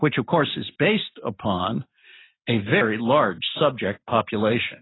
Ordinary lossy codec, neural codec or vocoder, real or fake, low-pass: AAC, 16 kbps; none; real; 7.2 kHz